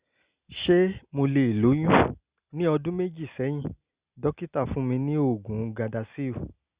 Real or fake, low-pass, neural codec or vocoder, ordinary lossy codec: real; 3.6 kHz; none; Opus, 64 kbps